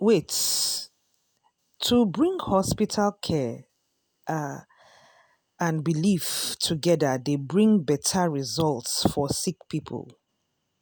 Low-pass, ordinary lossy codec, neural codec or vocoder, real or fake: none; none; none; real